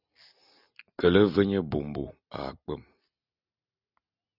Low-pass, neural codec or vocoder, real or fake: 5.4 kHz; none; real